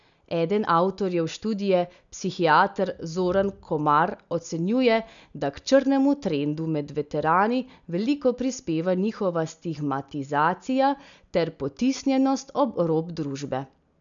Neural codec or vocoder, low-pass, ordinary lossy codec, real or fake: none; 7.2 kHz; none; real